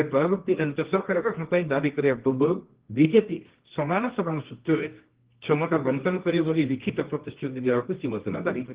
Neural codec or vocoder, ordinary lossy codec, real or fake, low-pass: codec, 24 kHz, 0.9 kbps, WavTokenizer, medium music audio release; Opus, 16 kbps; fake; 3.6 kHz